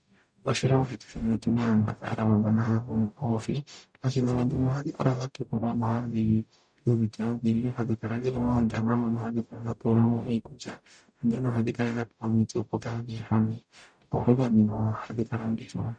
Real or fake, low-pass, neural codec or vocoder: fake; 9.9 kHz; codec, 44.1 kHz, 0.9 kbps, DAC